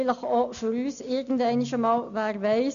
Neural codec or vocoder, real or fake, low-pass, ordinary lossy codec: none; real; 7.2 kHz; none